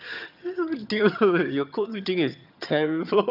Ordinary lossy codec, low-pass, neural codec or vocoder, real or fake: none; 5.4 kHz; vocoder, 22.05 kHz, 80 mel bands, HiFi-GAN; fake